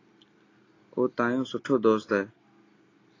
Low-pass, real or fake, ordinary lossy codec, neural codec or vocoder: 7.2 kHz; real; AAC, 32 kbps; none